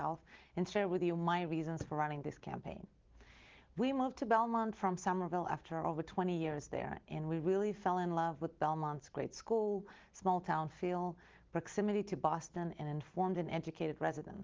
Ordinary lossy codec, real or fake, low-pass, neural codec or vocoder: Opus, 16 kbps; real; 7.2 kHz; none